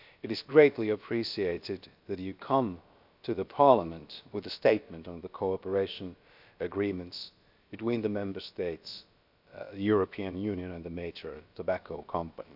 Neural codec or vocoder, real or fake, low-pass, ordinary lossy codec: codec, 16 kHz, about 1 kbps, DyCAST, with the encoder's durations; fake; 5.4 kHz; none